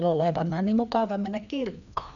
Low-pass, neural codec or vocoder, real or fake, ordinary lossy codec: 7.2 kHz; codec, 16 kHz, 2 kbps, FreqCodec, larger model; fake; none